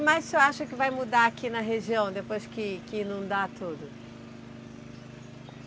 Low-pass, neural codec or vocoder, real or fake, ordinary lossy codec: none; none; real; none